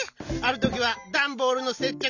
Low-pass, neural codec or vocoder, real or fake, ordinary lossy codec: 7.2 kHz; none; real; none